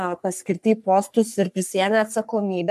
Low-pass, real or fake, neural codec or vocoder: 14.4 kHz; fake; codec, 44.1 kHz, 3.4 kbps, Pupu-Codec